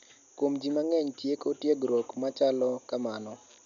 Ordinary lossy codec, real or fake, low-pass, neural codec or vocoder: none; real; 7.2 kHz; none